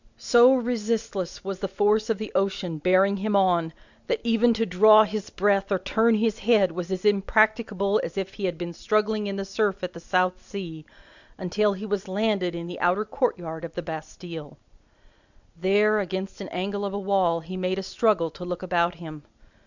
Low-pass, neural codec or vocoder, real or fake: 7.2 kHz; none; real